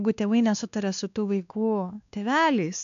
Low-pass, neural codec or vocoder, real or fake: 7.2 kHz; codec, 16 kHz, 2 kbps, X-Codec, WavLM features, trained on Multilingual LibriSpeech; fake